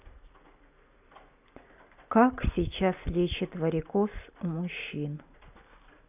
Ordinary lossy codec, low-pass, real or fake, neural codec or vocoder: none; 3.6 kHz; real; none